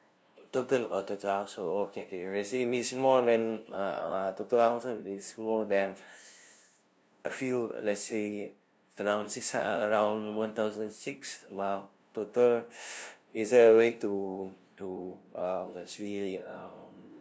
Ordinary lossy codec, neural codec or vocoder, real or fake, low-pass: none; codec, 16 kHz, 0.5 kbps, FunCodec, trained on LibriTTS, 25 frames a second; fake; none